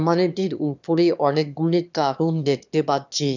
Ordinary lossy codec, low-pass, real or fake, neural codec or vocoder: none; 7.2 kHz; fake; autoencoder, 22.05 kHz, a latent of 192 numbers a frame, VITS, trained on one speaker